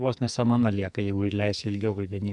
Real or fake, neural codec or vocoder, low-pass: fake; codec, 44.1 kHz, 2.6 kbps, SNAC; 10.8 kHz